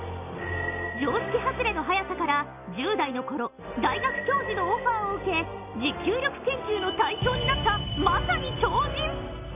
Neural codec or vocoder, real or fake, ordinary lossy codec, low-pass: none; real; none; 3.6 kHz